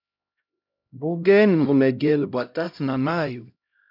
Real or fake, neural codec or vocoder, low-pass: fake; codec, 16 kHz, 0.5 kbps, X-Codec, HuBERT features, trained on LibriSpeech; 5.4 kHz